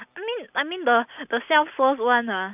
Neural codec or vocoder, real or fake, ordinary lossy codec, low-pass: none; real; none; 3.6 kHz